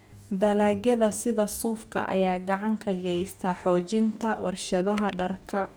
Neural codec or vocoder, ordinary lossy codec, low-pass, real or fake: codec, 44.1 kHz, 2.6 kbps, DAC; none; none; fake